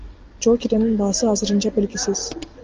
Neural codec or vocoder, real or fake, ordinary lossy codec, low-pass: none; real; Opus, 32 kbps; 7.2 kHz